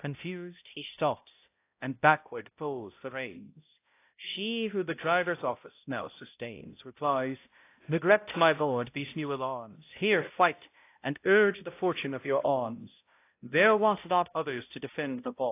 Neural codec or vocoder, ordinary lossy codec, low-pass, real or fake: codec, 16 kHz, 0.5 kbps, X-Codec, HuBERT features, trained on balanced general audio; AAC, 24 kbps; 3.6 kHz; fake